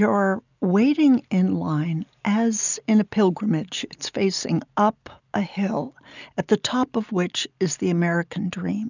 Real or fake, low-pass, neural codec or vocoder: real; 7.2 kHz; none